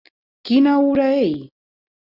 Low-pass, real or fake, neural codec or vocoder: 5.4 kHz; real; none